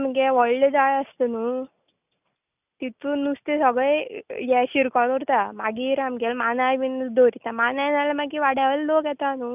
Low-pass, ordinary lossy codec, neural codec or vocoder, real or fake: 3.6 kHz; none; none; real